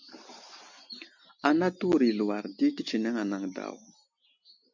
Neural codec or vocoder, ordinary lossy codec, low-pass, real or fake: none; MP3, 64 kbps; 7.2 kHz; real